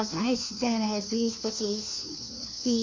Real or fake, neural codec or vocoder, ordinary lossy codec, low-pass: fake; codec, 16 kHz, 1 kbps, FunCodec, trained on LibriTTS, 50 frames a second; MP3, 48 kbps; 7.2 kHz